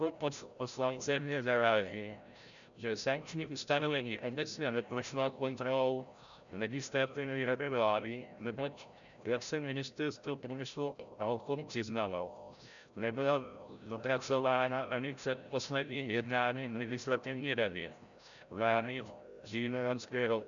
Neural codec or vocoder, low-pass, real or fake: codec, 16 kHz, 0.5 kbps, FreqCodec, larger model; 7.2 kHz; fake